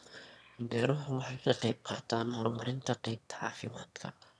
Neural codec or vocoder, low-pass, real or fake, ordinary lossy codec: autoencoder, 22.05 kHz, a latent of 192 numbers a frame, VITS, trained on one speaker; none; fake; none